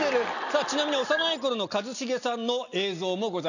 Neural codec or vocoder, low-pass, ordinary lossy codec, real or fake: none; 7.2 kHz; none; real